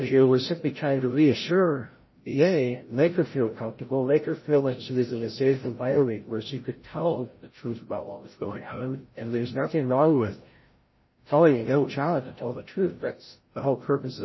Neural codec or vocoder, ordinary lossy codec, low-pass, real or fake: codec, 16 kHz, 0.5 kbps, FreqCodec, larger model; MP3, 24 kbps; 7.2 kHz; fake